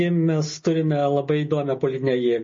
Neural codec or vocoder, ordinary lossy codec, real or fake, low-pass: none; MP3, 32 kbps; real; 7.2 kHz